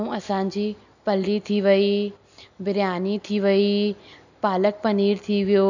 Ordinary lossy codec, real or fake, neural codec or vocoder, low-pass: none; real; none; 7.2 kHz